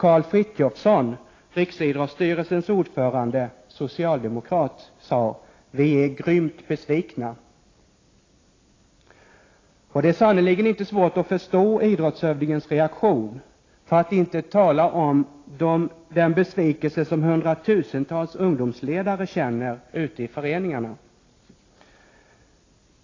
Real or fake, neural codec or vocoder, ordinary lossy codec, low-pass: real; none; AAC, 32 kbps; 7.2 kHz